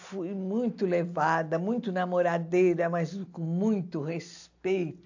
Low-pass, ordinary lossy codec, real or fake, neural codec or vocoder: 7.2 kHz; AAC, 48 kbps; real; none